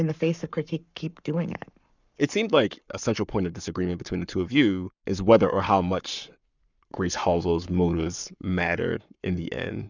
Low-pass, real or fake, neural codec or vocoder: 7.2 kHz; fake; codec, 44.1 kHz, 7.8 kbps, Pupu-Codec